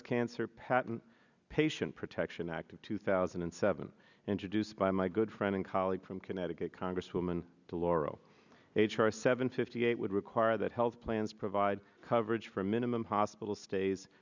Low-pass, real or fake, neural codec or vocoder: 7.2 kHz; real; none